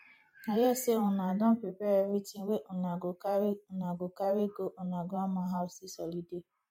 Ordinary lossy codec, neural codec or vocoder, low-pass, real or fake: MP3, 64 kbps; vocoder, 44.1 kHz, 128 mel bands every 512 samples, BigVGAN v2; 19.8 kHz; fake